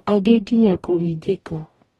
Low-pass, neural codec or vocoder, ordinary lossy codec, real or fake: 19.8 kHz; codec, 44.1 kHz, 0.9 kbps, DAC; AAC, 32 kbps; fake